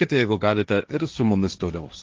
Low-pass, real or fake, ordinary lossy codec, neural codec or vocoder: 7.2 kHz; fake; Opus, 32 kbps; codec, 16 kHz, 1.1 kbps, Voila-Tokenizer